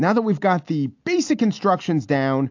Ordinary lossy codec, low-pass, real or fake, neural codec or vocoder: AAC, 48 kbps; 7.2 kHz; real; none